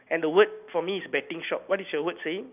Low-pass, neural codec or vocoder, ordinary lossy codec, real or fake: 3.6 kHz; none; none; real